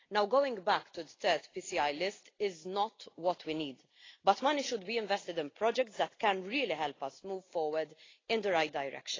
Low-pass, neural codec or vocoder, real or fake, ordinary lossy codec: 7.2 kHz; none; real; AAC, 32 kbps